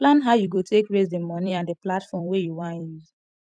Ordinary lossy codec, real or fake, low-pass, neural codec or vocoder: none; fake; 9.9 kHz; vocoder, 44.1 kHz, 128 mel bands every 256 samples, BigVGAN v2